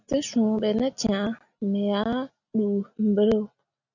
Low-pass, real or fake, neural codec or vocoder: 7.2 kHz; real; none